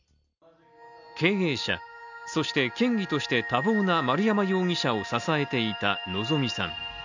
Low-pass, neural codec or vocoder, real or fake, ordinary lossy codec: 7.2 kHz; none; real; none